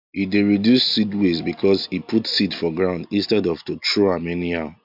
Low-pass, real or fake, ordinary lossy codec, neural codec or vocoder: 5.4 kHz; real; MP3, 48 kbps; none